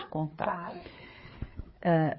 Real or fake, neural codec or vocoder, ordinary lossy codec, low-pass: fake; codec, 16 kHz, 4 kbps, FreqCodec, larger model; MP3, 24 kbps; 7.2 kHz